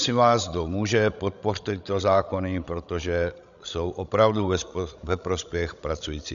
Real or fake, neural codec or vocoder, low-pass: fake; codec, 16 kHz, 16 kbps, FreqCodec, larger model; 7.2 kHz